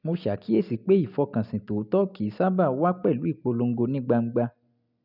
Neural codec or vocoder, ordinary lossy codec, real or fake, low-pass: none; none; real; 5.4 kHz